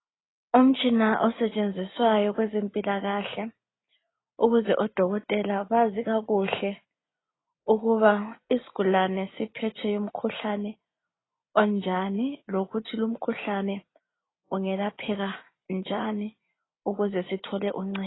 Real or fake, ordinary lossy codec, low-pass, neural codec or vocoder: real; AAC, 16 kbps; 7.2 kHz; none